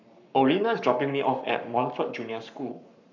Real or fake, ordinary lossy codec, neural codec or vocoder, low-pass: fake; none; codec, 44.1 kHz, 7.8 kbps, Pupu-Codec; 7.2 kHz